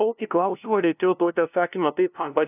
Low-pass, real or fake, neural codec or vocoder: 3.6 kHz; fake; codec, 16 kHz, 0.5 kbps, FunCodec, trained on LibriTTS, 25 frames a second